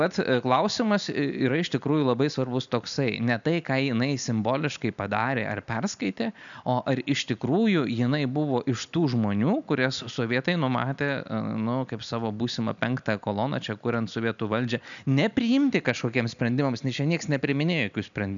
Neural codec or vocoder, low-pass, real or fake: none; 7.2 kHz; real